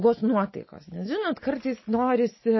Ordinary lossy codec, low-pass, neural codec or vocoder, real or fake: MP3, 24 kbps; 7.2 kHz; none; real